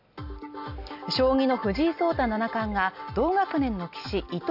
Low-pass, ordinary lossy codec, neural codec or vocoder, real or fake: 5.4 kHz; none; none; real